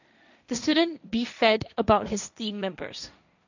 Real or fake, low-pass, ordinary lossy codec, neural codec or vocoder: fake; 7.2 kHz; none; codec, 16 kHz, 1.1 kbps, Voila-Tokenizer